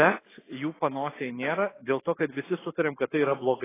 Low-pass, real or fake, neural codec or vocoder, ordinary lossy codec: 3.6 kHz; real; none; AAC, 16 kbps